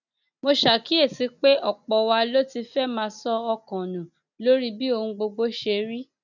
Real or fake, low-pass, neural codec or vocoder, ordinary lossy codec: real; 7.2 kHz; none; none